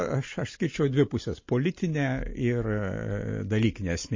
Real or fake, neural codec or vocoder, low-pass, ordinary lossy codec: real; none; 7.2 kHz; MP3, 32 kbps